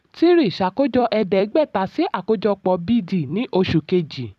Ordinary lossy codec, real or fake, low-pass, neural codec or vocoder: none; fake; 14.4 kHz; vocoder, 44.1 kHz, 128 mel bands every 256 samples, BigVGAN v2